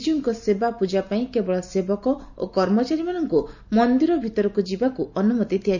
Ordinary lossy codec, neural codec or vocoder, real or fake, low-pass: none; none; real; 7.2 kHz